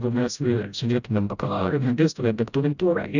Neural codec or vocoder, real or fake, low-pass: codec, 16 kHz, 0.5 kbps, FreqCodec, smaller model; fake; 7.2 kHz